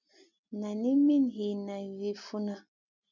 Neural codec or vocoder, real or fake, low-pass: none; real; 7.2 kHz